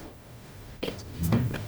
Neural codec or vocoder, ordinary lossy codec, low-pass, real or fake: codec, 44.1 kHz, 0.9 kbps, DAC; none; none; fake